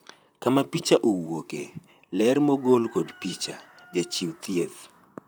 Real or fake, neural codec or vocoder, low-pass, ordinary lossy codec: fake; vocoder, 44.1 kHz, 128 mel bands, Pupu-Vocoder; none; none